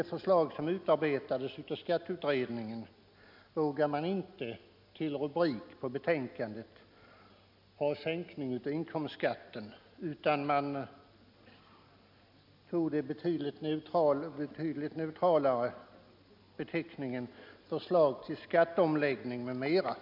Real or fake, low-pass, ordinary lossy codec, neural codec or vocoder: real; 5.4 kHz; MP3, 48 kbps; none